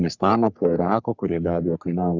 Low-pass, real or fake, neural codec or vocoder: 7.2 kHz; fake; codec, 44.1 kHz, 3.4 kbps, Pupu-Codec